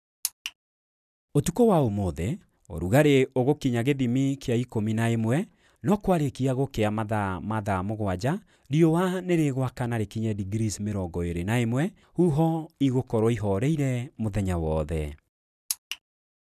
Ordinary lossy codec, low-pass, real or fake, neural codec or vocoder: none; 14.4 kHz; real; none